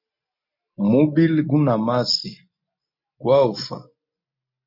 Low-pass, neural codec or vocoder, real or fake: 5.4 kHz; none; real